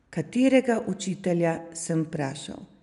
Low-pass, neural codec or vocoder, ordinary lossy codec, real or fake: 10.8 kHz; none; none; real